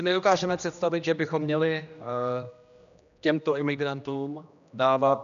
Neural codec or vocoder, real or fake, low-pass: codec, 16 kHz, 1 kbps, X-Codec, HuBERT features, trained on general audio; fake; 7.2 kHz